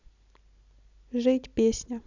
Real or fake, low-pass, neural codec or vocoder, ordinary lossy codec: real; 7.2 kHz; none; none